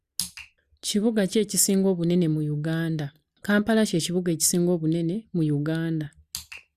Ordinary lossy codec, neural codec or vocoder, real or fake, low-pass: Opus, 64 kbps; none; real; 14.4 kHz